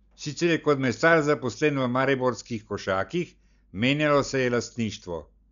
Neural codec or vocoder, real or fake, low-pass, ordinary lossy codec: none; real; 7.2 kHz; none